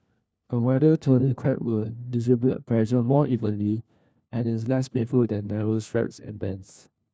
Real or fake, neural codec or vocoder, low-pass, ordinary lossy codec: fake; codec, 16 kHz, 1 kbps, FunCodec, trained on LibriTTS, 50 frames a second; none; none